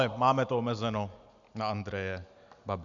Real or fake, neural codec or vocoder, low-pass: real; none; 7.2 kHz